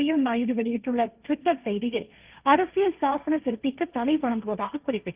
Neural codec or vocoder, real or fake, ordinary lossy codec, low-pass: codec, 16 kHz, 1.1 kbps, Voila-Tokenizer; fake; Opus, 24 kbps; 3.6 kHz